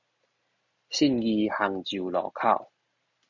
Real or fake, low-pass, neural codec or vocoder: real; 7.2 kHz; none